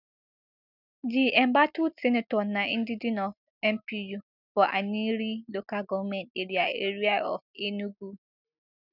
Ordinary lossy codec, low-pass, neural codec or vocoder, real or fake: none; 5.4 kHz; none; real